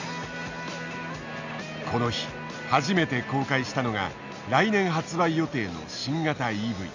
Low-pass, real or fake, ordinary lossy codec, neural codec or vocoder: 7.2 kHz; real; MP3, 64 kbps; none